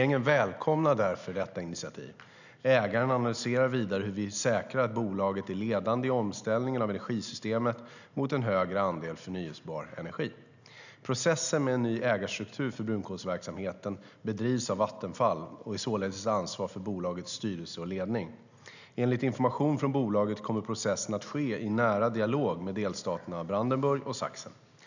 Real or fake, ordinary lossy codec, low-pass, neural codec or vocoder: real; none; 7.2 kHz; none